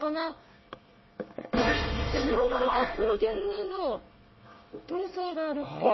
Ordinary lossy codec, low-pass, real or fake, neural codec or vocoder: MP3, 24 kbps; 7.2 kHz; fake; codec, 24 kHz, 1 kbps, SNAC